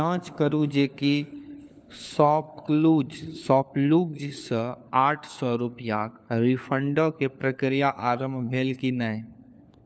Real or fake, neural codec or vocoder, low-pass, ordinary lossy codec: fake; codec, 16 kHz, 4 kbps, FunCodec, trained on LibriTTS, 50 frames a second; none; none